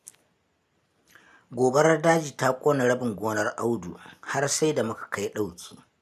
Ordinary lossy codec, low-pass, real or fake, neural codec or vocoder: none; 14.4 kHz; real; none